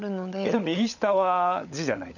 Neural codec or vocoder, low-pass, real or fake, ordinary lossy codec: codec, 16 kHz, 16 kbps, FunCodec, trained on LibriTTS, 50 frames a second; 7.2 kHz; fake; none